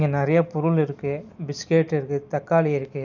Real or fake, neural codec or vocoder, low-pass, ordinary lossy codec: real; none; 7.2 kHz; none